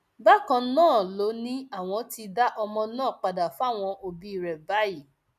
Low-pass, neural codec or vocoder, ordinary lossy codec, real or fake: 14.4 kHz; none; none; real